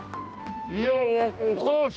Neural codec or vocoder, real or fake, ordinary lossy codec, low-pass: codec, 16 kHz, 1 kbps, X-Codec, HuBERT features, trained on balanced general audio; fake; none; none